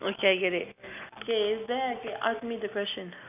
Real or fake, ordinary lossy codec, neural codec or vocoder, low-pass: real; none; none; 3.6 kHz